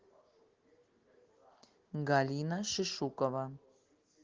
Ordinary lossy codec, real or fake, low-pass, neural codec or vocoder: Opus, 16 kbps; real; 7.2 kHz; none